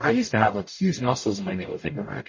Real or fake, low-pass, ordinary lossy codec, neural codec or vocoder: fake; 7.2 kHz; MP3, 32 kbps; codec, 44.1 kHz, 0.9 kbps, DAC